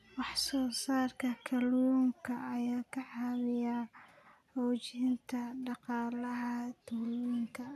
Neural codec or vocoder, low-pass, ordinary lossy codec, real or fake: none; 14.4 kHz; none; real